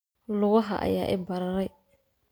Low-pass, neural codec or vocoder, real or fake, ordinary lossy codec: none; none; real; none